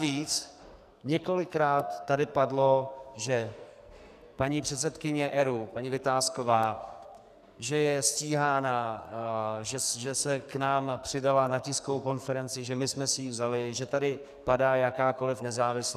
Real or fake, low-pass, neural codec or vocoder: fake; 14.4 kHz; codec, 44.1 kHz, 2.6 kbps, SNAC